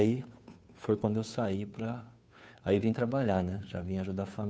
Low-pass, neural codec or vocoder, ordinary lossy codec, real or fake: none; codec, 16 kHz, 2 kbps, FunCodec, trained on Chinese and English, 25 frames a second; none; fake